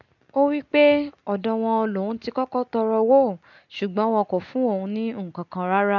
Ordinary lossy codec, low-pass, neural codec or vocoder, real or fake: none; 7.2 kHz; none; real